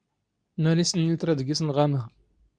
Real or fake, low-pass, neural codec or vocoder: fake; 9.9 kHz; codec, 24 kHz, 0.9 kbps, WavTokenizer, medium speech release version 2